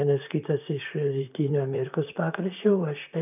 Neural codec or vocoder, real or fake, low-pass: vocoder, 44.1 kHz, 128 mel bands, Pupu-Vocoder; fake; 3.6 kHz